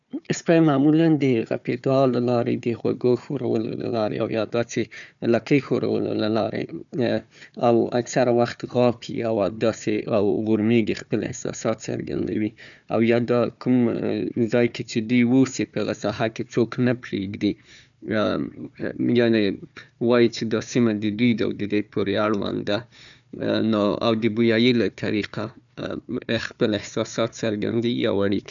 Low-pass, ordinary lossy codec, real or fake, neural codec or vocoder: 7.2 kHz; none; fake; codec, 16 kHz, 4 kbps, FunCodec, trained on Chinese and English, 50 frames a second